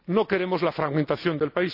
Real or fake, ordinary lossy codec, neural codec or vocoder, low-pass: real; none; none; 5.4 kHz